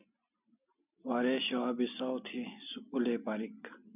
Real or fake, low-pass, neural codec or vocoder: real; 3.6 kHz; none